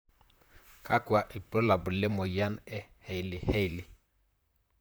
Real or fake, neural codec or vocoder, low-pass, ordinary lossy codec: fake; vocoder, 44.1 kHz, 128 mel bands, Pupu-Vocoder; none; none